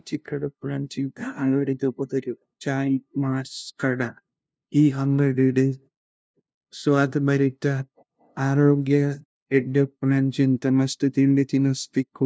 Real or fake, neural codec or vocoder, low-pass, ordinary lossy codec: fake; codec, 16 kHz, 0.5 kbps, FunCodec, trained on LibriTTS, 25 frames a second; none; none